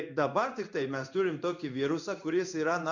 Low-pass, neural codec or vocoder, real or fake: 7.2 kHz; codec, 16 kHz in and 24 kHz out, 1 kbps, XY-Tokenizer; fake